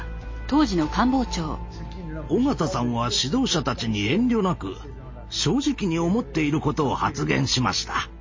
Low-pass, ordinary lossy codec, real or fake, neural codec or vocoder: 7.2 kHz; MP3, 32 kbps; real; none